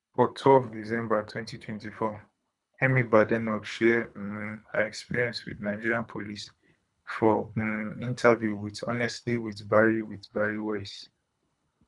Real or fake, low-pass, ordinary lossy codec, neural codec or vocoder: fake; 10.8 kHz; none; codec, 24 kHz, 3 kbps, HILCodec